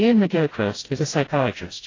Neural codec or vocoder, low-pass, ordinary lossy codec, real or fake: codec, 16 kHz, 0.5 kbps, FreqCodec, smaller model; 7.2 kHz; AAC, 32 kbps; fake